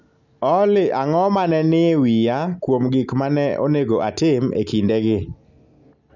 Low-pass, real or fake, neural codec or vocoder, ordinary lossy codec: 7.2 kHz; real; none; none